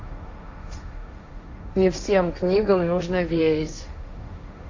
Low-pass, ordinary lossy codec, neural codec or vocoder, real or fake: 7.2 kHz; AAC, 48 kbps; codec, 16 kHz, 1.1 kbps, Voila-Tokenizer; fake